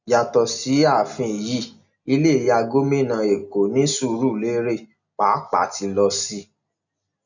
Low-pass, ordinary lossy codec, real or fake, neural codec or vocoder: 7.2 kHz; none; real; none